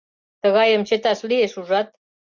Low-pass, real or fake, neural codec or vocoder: 7.2 kHz; real; none